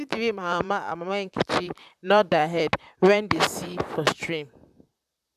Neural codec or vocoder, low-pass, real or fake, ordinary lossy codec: vocoder, 44.1 kHz, 128 mel bands, Pupu-Vocoder; 14.4 kHz; fake; none